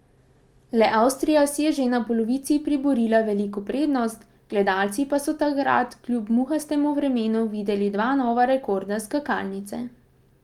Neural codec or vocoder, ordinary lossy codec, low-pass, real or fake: none; Opus, 32 kbps; 19.8 kHz; real